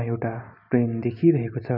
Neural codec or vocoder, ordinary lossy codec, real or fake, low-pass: none; none; real; 5.4 kHz